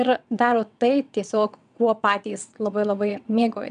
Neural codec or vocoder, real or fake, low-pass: vocoder, 22.05 kHz, 80 mel bands, WaveNeXt; fake; 9.9 kHz